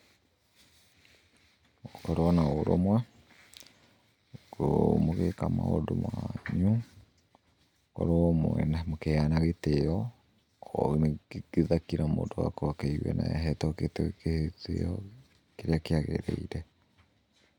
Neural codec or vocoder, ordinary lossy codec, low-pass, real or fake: none; none; 19.8 kHz; real